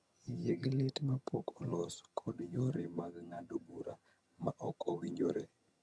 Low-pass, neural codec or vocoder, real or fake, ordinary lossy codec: none; vocoder, 22.05 kHz, 80 mel bands, HiFi-GAN; fake; none